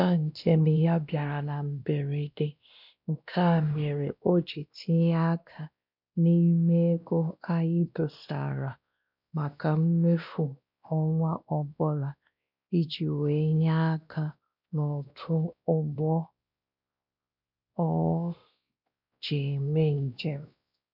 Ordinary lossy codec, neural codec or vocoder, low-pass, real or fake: none; codec, 16 kHz, 1 kbps, X-Codec, WavLM features, trained on Multilingual LibriSpeech; 5.4 kHz; fake